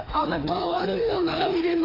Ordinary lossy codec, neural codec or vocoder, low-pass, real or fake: AAC, 24 kbps; codec, 16 kHz, 2 kbps, FreqCodec, larger model; 5.4 kHz; fake